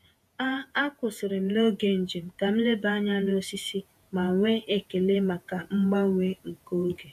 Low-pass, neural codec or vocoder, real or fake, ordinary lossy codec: 14.4 kHz; vocoder, 48 kHz, 128 mel bands, Vocos; fake; AAC, 96 kbps